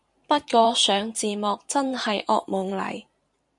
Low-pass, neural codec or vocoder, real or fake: 10.8 kHz; vocoder, 44.1 kHz, 128 mel bands every 512 samples, BigVGAN v2; fake